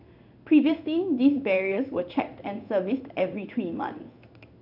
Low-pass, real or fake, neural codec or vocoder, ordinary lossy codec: 5.4 kHz; fake; codec, 16 kHz in and 24 kHz out, 1 kbps, XY-Tokenizer; none